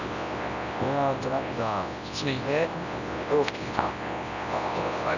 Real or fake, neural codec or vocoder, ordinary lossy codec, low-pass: fake; codec, 24 kHz, 0.9 kbps, WavTokenizer, large speech release; none; 7.2 kHz